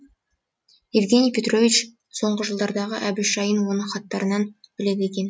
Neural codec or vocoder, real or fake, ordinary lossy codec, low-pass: none; real; none; none